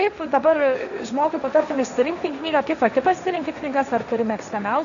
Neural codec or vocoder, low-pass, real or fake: codec, 16 kHz, 1.1 kbps, Voila-Tokenizer; 7.2 kHz; fake